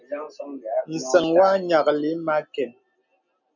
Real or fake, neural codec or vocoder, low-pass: real; none; 7.2 kHz